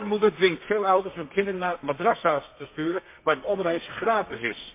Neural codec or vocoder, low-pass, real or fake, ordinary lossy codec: codec, 32 kHz, 1.9 kbps, SNAC; 3.6 kHz; fake; MP3, 24 kbps